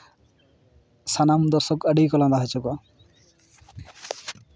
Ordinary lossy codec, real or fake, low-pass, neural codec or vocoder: none; real; none; none